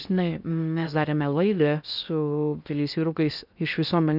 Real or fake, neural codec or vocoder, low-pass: fake; codec, 16 kHz in and 24 kHz out, 0.6 kbps, FocalCodec, streaming, 4096 codes; 5.4 kHz